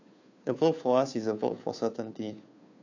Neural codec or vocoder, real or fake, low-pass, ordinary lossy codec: codec, 16 kHz, 2 kbps, FunCodec, trained on Chinese and English, 25 frames a second; fake; 7.2 kHz; AAC, 48 kbps